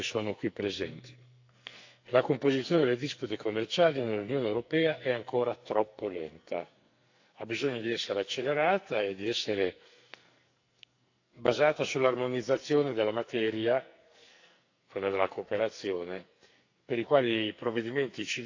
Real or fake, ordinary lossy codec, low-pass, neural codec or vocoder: fake; AAC, 48 kbps; 7.2 kHz; codec, 44.1 kHz, 2.6 kbps, SNAC